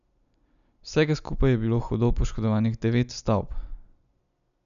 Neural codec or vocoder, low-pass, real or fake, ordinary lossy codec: none; 7.2 kHz; real; none